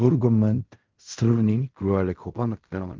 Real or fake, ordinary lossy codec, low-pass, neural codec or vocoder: fake; Opus, 32 kbps; 7.2 kHz; codec, 16 kHz in and 24 kHz out, 0.4 kbps, LongCat-Audio-Codec, fine tuned four codebook decoder